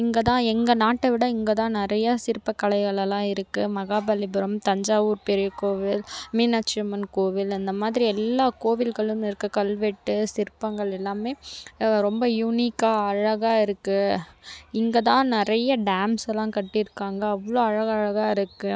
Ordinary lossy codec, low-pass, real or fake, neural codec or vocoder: none; none; real; none